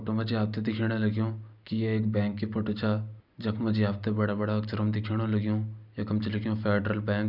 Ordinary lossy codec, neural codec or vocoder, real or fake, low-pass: none; none; real; 5.4 kHz